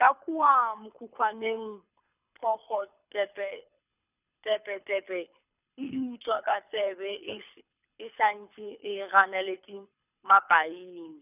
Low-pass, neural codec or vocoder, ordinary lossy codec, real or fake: 3.6 kHz; codec, 24 kHz, 6 kbps, HILCodec; none; fake